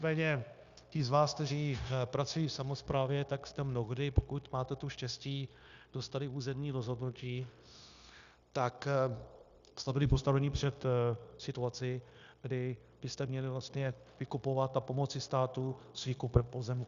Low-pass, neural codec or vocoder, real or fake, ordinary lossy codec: 7.2 kHz; codec, 16 kHz, 0.9 kbps, LongCat-Audio-Codec; fake; Opus, 64 kbps